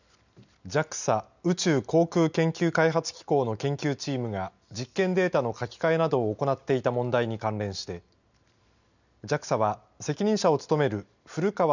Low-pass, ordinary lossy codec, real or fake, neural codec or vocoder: 7.2 kHz; none; real; none